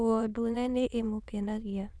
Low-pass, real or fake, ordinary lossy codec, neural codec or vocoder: none; fake; none; autoencoder, 22.05 kHz, a latent of 192 numbers a frame, VITS, trained on many speakers